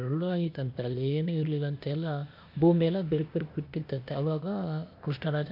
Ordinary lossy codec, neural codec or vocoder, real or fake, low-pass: MP3, 48 kbps; codec, 16 kHz, 0.8 kbps, ZipCodec; fake; 5.4 kHz